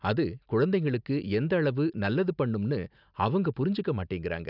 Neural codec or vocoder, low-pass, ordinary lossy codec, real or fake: none; 5.4 kHz; none; real